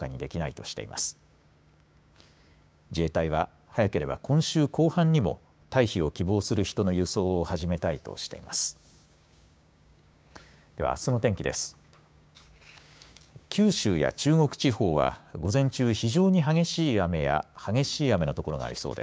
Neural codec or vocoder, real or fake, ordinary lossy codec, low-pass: codec, 16 kHz, 6 kbps, DAC; fake; none; none